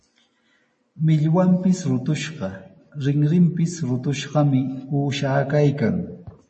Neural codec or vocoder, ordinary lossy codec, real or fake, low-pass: none; MP3, 32 kbps; real; 10.8 kHz